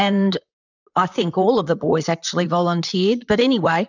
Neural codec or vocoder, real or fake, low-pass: vocoder, 44.1 kHz, 128 mel bands, Pupu-Vocoder; fake; 7.2 kHz